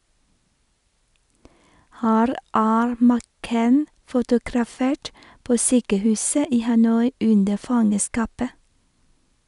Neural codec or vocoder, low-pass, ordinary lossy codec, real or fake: none; 10.8 kHz; none; real